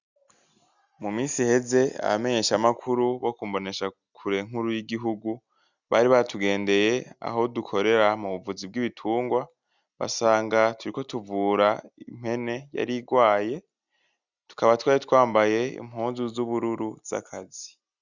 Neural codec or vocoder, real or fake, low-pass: none; real; 7.2 kHz